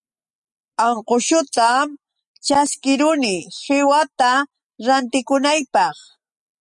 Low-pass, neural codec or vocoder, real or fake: 10.8 kHz; none; real